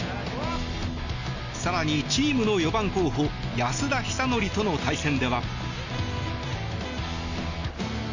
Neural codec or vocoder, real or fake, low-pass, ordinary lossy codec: none; real; 7.2 kHz; none